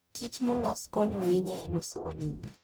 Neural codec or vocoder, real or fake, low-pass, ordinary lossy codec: codec, 44.1 kHz, 0.9 kbps, DAC; fake; none; none